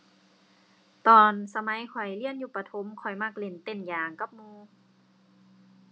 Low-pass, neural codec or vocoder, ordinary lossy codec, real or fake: none; none; none; real